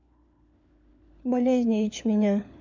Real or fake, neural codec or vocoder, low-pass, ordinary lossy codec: fake; codec, 16 kHz, 4 kbps, FunCodec, trained on LibriTTS, 50 frames a second; 7.2 kHz; MP3, 64 kbps